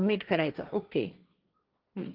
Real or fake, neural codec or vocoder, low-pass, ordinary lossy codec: fake; codec, 16 kHz, 1.1 kbps, Voila-Tokenizer; 5.4 kHz; Opus, 32 kbps